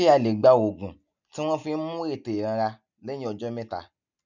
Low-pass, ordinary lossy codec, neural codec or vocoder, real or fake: 7.2 kHz; none; none; real